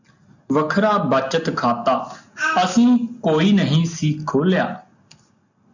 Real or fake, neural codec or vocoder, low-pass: real; none; 7.2 kHz